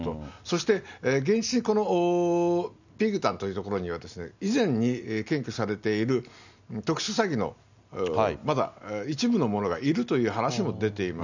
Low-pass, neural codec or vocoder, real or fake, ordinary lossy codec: 7.2 kHz; none; real; none